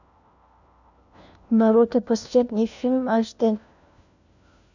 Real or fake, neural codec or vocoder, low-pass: fake; codec, 16 kHz, 1 kbps, FunCodec, trained on LibriTTS, 50 frames a second; 7.2 kHz